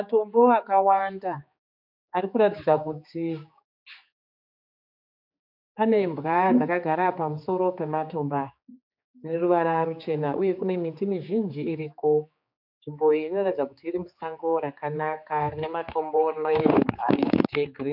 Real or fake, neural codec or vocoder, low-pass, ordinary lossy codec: fake; codec, 16 kHz, 4 kbps, X-Codec, HuBERT features, trained on general audio; 5.4 kHz; MP3, 48 kbps